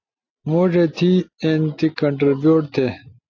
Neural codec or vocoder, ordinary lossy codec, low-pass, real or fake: none; AAC, 48 kbps; 7.2 kHz; real